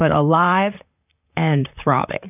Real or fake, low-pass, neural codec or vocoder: fake; 3.6 kHz; vocoder, 44.1 kHz, 128 mel bands, Pupu-Vocoder